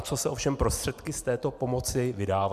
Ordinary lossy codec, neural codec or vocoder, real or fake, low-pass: MP3, 96 kbps; none; real; 14.4 kHz